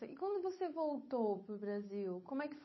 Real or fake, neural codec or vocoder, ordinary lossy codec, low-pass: fake; codec, 16 kHz, 8 kbps, FunCodec, trained on Chinese and English, 25 frames a second; MP3, 24 kbps; 7.2 kHz